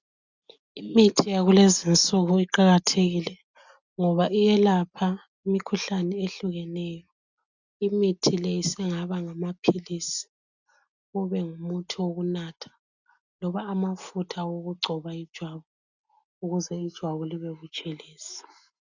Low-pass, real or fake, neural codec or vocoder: 7.2 kHz; real; none